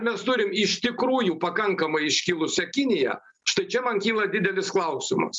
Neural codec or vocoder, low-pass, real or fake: none; 10.8 kHz; real